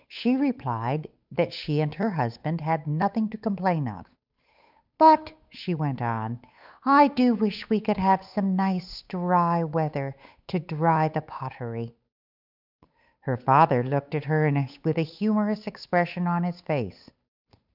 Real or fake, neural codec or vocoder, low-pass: fake; codec, 16 kHz, 8 kbps, FunCodec, trained on Chinese and English, 25 frames a second; 5.4 kHz